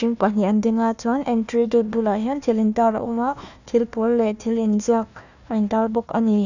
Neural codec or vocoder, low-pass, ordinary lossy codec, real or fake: codec, 16 kHz, 1 kbps, FunCodec, trained on Chinese and English, 50 frames a second; 7.2 kHz; none; fake